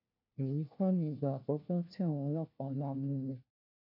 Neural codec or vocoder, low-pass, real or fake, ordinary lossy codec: codec, 16 kHz, 1 kbps, FunCodec, trained on LibriTTS, 50 frames a second; 5.4 kHz; fake; none